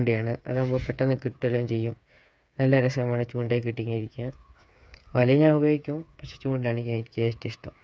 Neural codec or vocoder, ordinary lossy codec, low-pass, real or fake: codec, 16 kHz, 8 kbps, FreqCodec, smaller model; none; none; fake